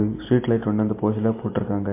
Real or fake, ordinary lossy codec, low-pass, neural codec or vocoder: real; MP3, 24 kbps; 3.6 kHz; none